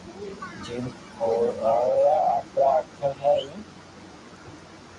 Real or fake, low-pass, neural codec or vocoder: fake; 10.8 kHz; vocoder, 24 kHz, 100 mel bands, Vocos